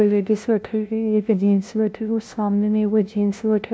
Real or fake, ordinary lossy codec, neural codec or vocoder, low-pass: fake; none; codec, 16 kHz, 0.5 kbps, FunCodec, trained on LibriTTS, 25 frames a second; none